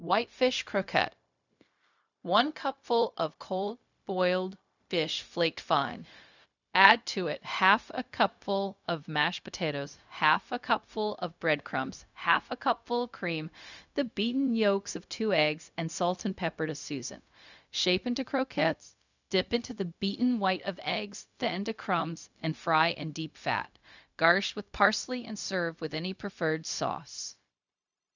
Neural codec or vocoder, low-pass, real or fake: codec, 16 kHz, 0.4 kbps, LongCat-Audio-Codec; 7.2 kHz; fake